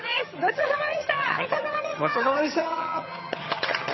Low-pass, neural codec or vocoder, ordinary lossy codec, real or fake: 7.2 kHz; vocoder, 22.05 kHz, 80 mel bands, HiFi-GAN; MP3, 24 kbps; fake